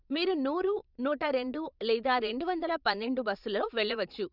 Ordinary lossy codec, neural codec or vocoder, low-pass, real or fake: none; vocoder, 22.05 kHz, 80 mel bands, Vocos; 5.4 kHz; fake